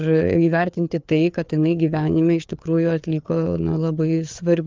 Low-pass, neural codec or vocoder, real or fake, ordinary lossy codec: 7.2 kHz; codec, 16 kHz, 4 kbps, FreqCodec, larger model; fake; Opus, 24 kbps